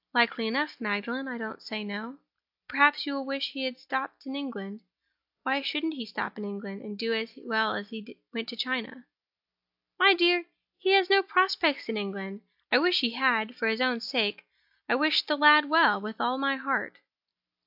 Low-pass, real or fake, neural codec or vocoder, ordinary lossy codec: 5.4 kHz; real; none; AAC, 48 kbps